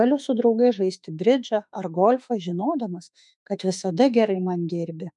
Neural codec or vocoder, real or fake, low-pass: autoencoder, 48 kHz, 32 numbers a frame, DAC-VAE, trained on Japanese speech; fake; 10.8 kHz